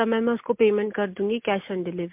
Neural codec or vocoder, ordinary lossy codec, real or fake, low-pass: none; MP3, 32 kbps; real; 3.6 kHz